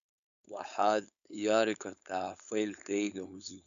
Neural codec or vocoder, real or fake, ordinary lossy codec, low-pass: codec, 16 kHz, 4.8 kbps, FACodec; fake; MP3, 96 kbps; 7.2 kHz